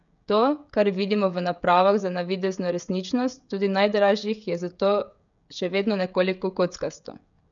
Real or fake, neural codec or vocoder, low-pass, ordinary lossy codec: fake; codec, 16 kHz, 16 kbps, FreqCodec, smaller model; 7.2 kHz; none